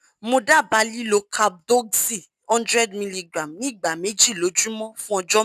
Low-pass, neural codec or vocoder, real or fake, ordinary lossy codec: 14.4 kHz; none; real; none